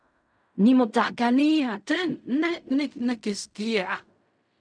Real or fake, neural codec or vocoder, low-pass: fake; codec, 16 kHz in and 24 kHz out, 0.4 kbps, LongCat-Audio-Codec, fine tuned four codebook decoder; 9.9 kHz